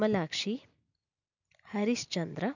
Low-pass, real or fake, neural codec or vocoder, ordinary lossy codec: 7.2 kHz; real; none; none